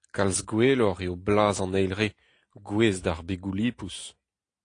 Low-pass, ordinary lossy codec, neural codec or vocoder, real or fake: 9.9 kHz; AAC, 48 kbps; none; real